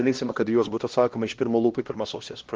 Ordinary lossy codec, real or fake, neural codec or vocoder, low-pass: Opus, 16 kbps; fake; codec, 16 kHz, 1 kbps, X-Codec, HuBERT features, trained on LibriSpeech; 7.2 kHz